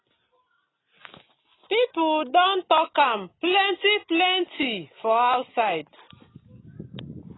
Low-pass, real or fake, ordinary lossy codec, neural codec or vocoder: 7.2 kHz; real; AAC, 16 kbps; none